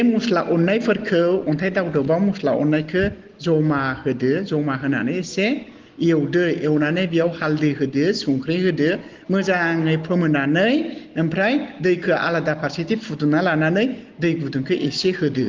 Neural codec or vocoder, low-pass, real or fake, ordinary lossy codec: none; 7.2 kHz; real; Opus, 16 kbps